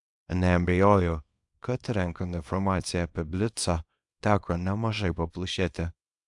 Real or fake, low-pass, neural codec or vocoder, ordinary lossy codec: fake; 10.8 kHz; codec, 24 kHz, 0.9 kbps, WavTokenizer, small release; AAC, 64 kbps